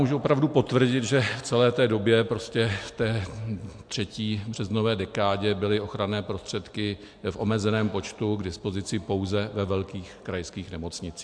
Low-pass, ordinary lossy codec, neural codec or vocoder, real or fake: 9.9 kHz; MP3, 64 kbps; none; real